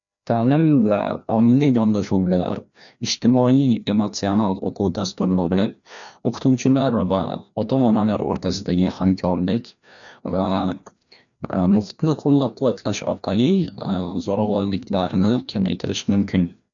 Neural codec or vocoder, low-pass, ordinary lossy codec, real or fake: codec, 16 kHz, 1 kbps, FreqCodec, larger model; 7.2 kHz; MP3, 96 kbps; fake